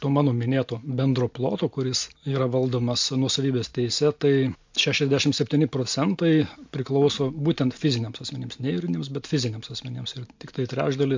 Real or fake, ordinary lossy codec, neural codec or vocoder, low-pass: real; MP3, 48 kbps; none; 7.2 kHz